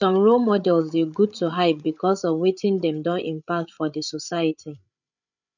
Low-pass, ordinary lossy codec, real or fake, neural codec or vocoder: 7.2 kHz; none; fake; codec, 16 kHz, 8 kbps, FreqCodec, larger model